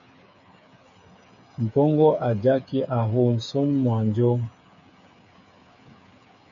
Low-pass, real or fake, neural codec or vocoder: 7.2 kHz; fake; codec, 16 kHz, 8 kbps, FreqCodec, smaller model